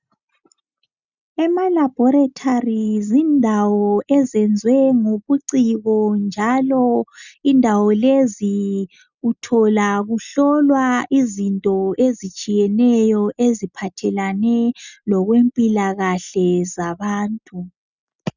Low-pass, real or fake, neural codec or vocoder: 7.2 kHz; real; none